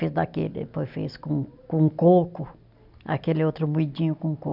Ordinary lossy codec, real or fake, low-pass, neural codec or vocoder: none; real; 5.4 kHz; none